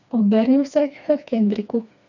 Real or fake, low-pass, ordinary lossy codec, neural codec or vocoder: fake; 7.2 kHz; none; codec, 16 kHz, 2 kbps, FreqCodec, smaller model